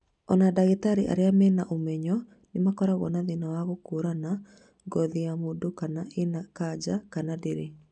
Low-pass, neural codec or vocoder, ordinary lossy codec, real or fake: 9.9 kHz; none; none; real